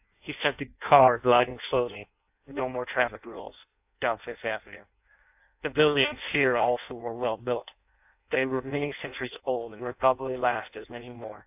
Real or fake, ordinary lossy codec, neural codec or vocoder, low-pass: fake; AAC, 32 kbps; codec, 16 kHz in and 24 kHz out, 0.6 kbps, FireRedTTS-2 codec; 3.6 kHz